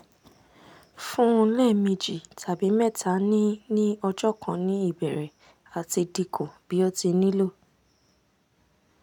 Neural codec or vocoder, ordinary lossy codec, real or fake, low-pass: none; none; real; 19.8 kHz